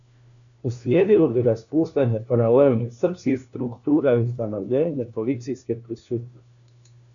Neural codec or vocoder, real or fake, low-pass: codec, 16 kHz, 1 kbps, FunCodec, trained on LibriTTS, 50 frames a second; fake; 7.2 kHz